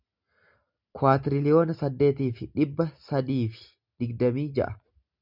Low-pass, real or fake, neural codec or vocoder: 5.4 kHz; real; none